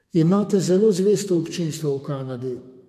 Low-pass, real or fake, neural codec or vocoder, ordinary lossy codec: 14.4 kHz; fake; codec, 32 kHz, 1.9 kbps, SNAC; MP3, 64 kbps